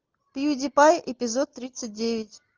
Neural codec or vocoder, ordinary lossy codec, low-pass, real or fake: none; Opus, 24 kbps; 7.2 kHz; real